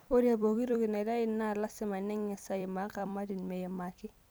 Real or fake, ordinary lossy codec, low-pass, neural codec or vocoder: fake; none; none; vocoder, 44.1 kHz, 128 mel bands every 256 samples, BigVGAN v2